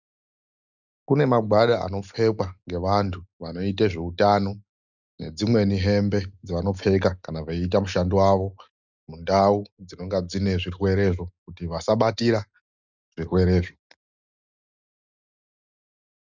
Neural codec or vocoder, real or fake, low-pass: none; real; 7.2 kHz